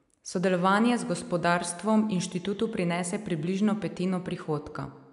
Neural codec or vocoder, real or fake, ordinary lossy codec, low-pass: none; real; none; 10.8 kHz